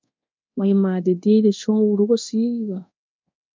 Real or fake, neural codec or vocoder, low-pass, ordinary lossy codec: fake; codec, 24 kHz, 0.9 kbps, DualCodec; 7.2 kHz; MP3, 64 kbps